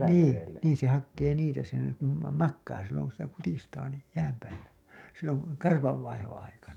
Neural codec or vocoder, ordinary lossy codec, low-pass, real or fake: codec, 44.1 kHz, 7.8 kbps, DAC; none; 19.8 kHz; fake